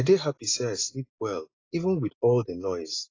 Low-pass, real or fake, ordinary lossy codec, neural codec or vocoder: 7.2 kHz; real; AAC, 32 kbps; none